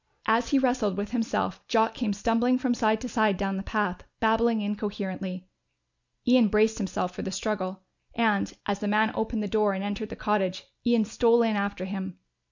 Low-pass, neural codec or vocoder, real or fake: 7.2 kHz; none; real